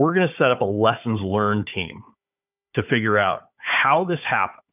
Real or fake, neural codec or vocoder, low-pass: fake; codec, 16 kHz, 4 kbps, FunCodec, trained on Chinese and English, 50 frames a second; 3.6 kHz